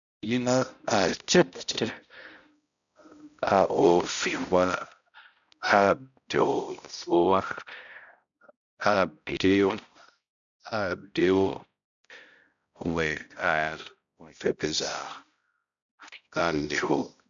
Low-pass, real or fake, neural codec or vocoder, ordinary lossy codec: 7.2 kHz; fake; codec, 16 kHz, 0.5 kbps, X-Codec, HuBERT features, trained on balanced general audio; MP3, 96 kbps